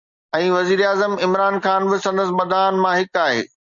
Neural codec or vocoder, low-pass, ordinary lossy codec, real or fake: none; 7.2 kHz; Opus, 64 kbps; real